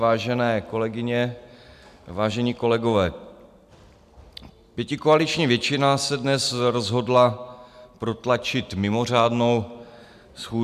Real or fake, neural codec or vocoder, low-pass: real; none; 14.4 kHz